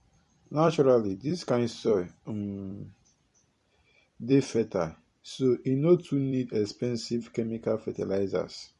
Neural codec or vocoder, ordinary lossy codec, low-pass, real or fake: vocoder, 44.1 kHz, 128 mel bands every 256 samples, BigVGAN v2; MP3, 48 kbps; 14.4 kHz; fake